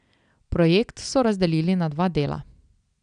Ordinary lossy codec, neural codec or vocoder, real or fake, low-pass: none; none; real; 9.9 kHz